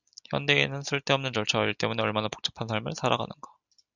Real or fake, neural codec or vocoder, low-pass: real; none; 7.2 kHz